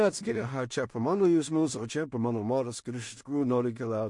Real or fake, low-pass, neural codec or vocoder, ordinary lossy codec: fake; 10.8 kHz; codec, 16 kHz in and 24 kHz out, 0.4 kbps, LongCat-Audio-Codec, two codebook decoder; MP3, 48 kbps